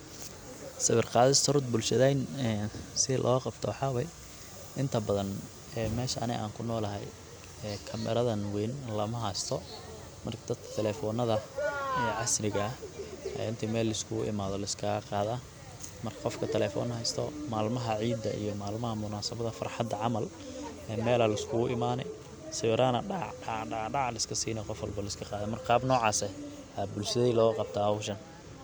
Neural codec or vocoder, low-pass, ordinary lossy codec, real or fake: none; none; none; real